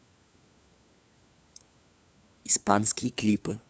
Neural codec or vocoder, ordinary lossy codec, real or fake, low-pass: codec, 16 kHz, 4 kbps, FunCodec, trained on LibriTTS, 50 frames a second; none; fake; none